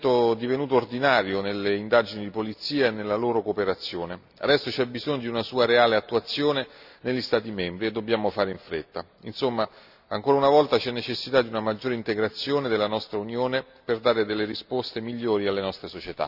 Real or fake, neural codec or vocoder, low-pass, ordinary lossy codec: real; none; 5.4 kHz; none